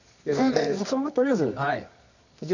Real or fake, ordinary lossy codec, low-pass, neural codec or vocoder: fake; none; 7.2 kHz; codec, 24 kHz, 0.9 kbps, WavTokenizer, medium music audio release